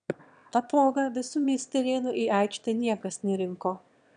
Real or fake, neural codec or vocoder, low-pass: fake; autoencoder, 22.05 kHz, a latent of 192 numbers a frame, VITS, trained on one speaker; 9.9 kHz